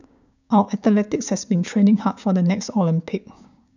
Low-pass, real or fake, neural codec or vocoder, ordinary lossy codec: 7.2 kHz; fake; codec, 16 kHz, 6 kbps, DAC; none